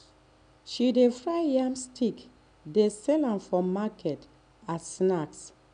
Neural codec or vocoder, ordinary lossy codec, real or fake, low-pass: none; none; real; 9.9 kHz